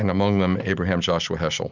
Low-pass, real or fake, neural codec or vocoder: 7.2 kHz; real; none